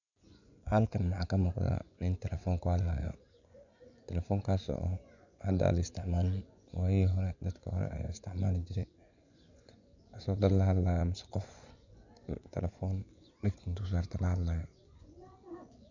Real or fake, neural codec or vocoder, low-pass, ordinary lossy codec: fake; vocoder, 24 kHz, 100 mel bands, Vocos; 7.2 kHz; none